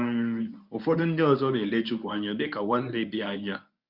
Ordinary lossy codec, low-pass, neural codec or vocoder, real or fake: Opus, 64 kbps; 5.4 kHz; codec, 24 kHz, 0.9 kbps, WavTokenizer, medium speech release version 1; fake